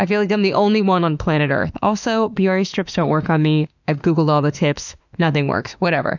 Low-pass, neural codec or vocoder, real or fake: 7.2 kHz; autoencoder, 48 kHz, 32 numbers a frame, DAC-VAE, trained on Japanese speech; fake